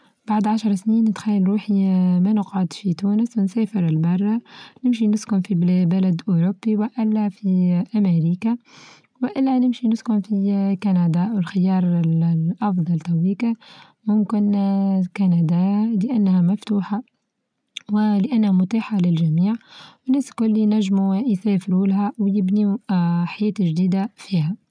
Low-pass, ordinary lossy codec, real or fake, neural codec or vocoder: 9.9 kHz; none; real; none